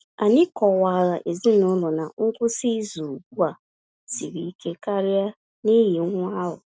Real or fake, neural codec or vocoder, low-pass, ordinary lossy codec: real; none; none; none